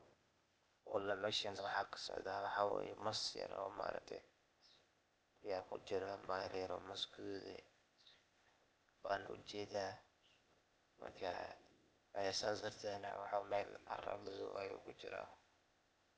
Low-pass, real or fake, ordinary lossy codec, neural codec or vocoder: none; fake; none; codec, 16 kHz, 0.8 kbps, ZipCodec